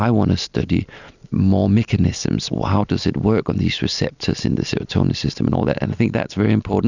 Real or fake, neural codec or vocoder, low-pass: real; none; 7.2 kHz